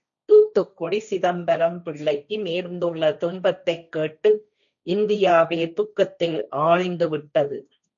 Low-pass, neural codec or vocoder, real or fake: 7.2 kHz; codec, 16 kHz, 1.1 kbps, Voila-Tokenizer; fake